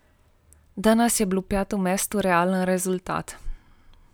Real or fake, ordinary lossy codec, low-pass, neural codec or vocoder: real; none; none; none